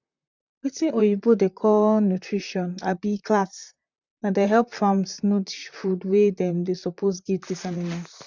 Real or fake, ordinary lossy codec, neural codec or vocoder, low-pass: fake; none; vocoder, 44.1 kHz, 128 mel bands, Pupu-Vocoder; 7.2 kHz